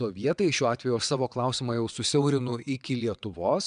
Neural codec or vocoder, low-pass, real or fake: vocoder, 22.05 kHz, 80 mel bands, WaveNeXt; 9.9 kHz; fake